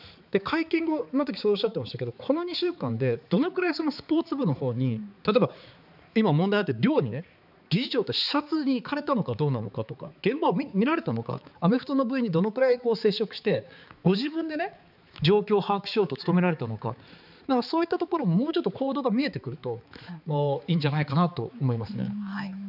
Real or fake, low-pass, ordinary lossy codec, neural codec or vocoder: fake; 5.4 kHz; none; codec, 16 kHz, 4 kbps, X-Codec, HuBERT features, trained on balanced general audio